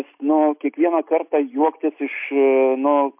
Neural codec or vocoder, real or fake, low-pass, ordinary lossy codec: none; real; 3.6 kHz; MP3, 32 kbps